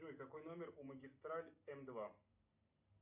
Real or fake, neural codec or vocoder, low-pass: real; none; 3.6 kHz